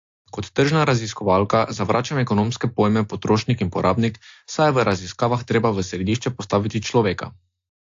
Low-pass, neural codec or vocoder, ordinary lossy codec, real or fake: 7.2 kHz; none; AAC, 48 kbps; real